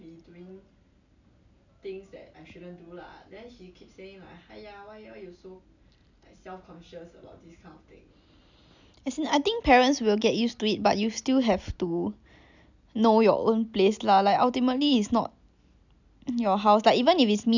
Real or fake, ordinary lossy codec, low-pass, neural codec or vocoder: real; none; 7.2 kHz; none